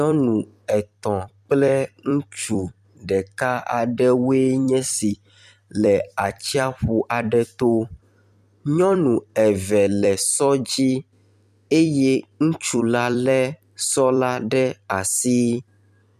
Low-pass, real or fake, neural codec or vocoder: 14.4 kHz; fake; vocoder, 44.1 kHz, 128 mel bands every 256 samples, BigVGAN v2